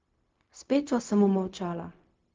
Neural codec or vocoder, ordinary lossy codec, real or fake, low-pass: codec, 16 kHz, 0.4 kbps, LongCat-Audio-Codec; Opus, 32 kbps; fake; 7.2 kHz